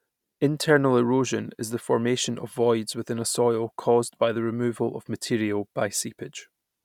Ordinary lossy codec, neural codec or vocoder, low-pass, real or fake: none; none; 19.8 kHz; real